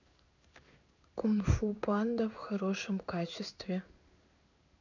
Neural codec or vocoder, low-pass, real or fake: codec, 16 kHz in and 24 kHz out, 1 kbps, XY-Tokenizer; 7.2 kHz; fake